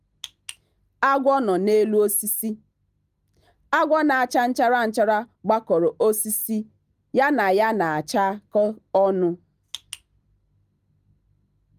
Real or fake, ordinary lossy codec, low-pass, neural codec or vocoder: real; Opus, 32 kbps; 14.4 kHz; none